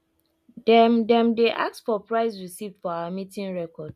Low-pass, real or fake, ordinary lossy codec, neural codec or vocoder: 14.4 kHz; real; none; none